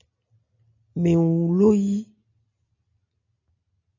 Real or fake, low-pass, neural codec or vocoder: real; 7.2 kHz; none